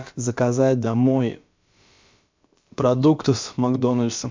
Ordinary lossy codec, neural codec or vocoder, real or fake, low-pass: MP3, 64 kbps; codec, 16 kHz, about 1 kbps, DyCAST, with the encoder's durations; fake; 7.2 kHz